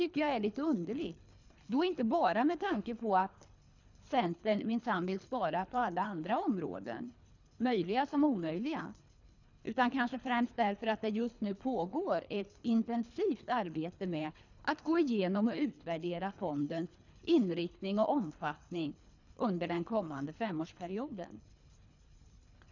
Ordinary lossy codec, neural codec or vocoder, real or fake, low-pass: none; codec, 24 kHz, 3 kbps, HILCodec; fake; 7.2 kHz